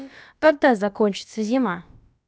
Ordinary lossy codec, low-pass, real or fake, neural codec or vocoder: none; none; fake; codec, 16 kHz, about 1 kbps, DyCAST, with the encoder's durations